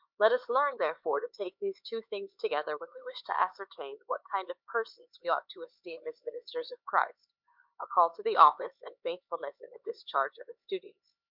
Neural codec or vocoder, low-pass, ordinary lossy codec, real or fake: codec, 16 kHz, 4 kbps, X-Codec, HuBERT features, trained on LibriSpeech; 5.4 kHz; MP3, 48 kbps; fake